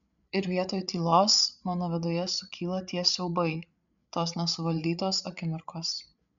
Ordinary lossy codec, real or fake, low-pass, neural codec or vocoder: MP3, 96 kbps; fake; 7.2 kHz; codec, 16 kHz, 8 kbps, FreqCodec, larger model